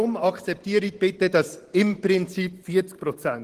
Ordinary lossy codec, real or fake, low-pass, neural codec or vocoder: Opus, 16 kbps; fake; 14.4 kHz; vocoder, 44.1 kHz, 128 mel bands every 512 samples, BigVGAN v2